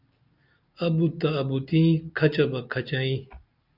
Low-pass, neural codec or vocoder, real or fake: 5.4 kHz; none; real